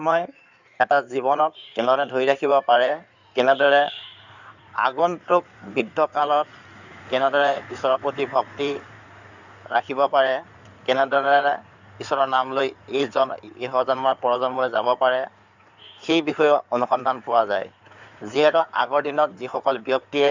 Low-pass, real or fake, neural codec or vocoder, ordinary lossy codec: 7.2 kHz; fake; codec, 16 kHz in and 24 kHz out, 2.2 kbps, FireRedTTS-2 codec; none